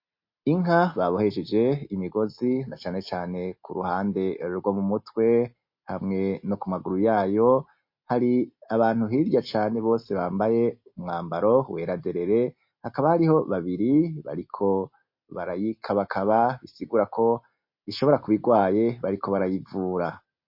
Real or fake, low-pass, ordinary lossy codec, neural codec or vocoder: real; 5.4 kHz; MP3, 32 kbps; none